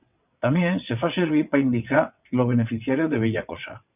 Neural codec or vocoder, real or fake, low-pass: vocoder, 22.05 kHz, 80 mel bands, WaveNeXt; fake; 3.6 kHz